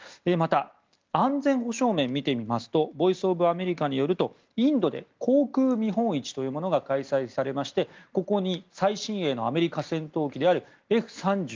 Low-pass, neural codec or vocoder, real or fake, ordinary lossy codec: 7.2 kHz; none; real; Opus, 32 kbps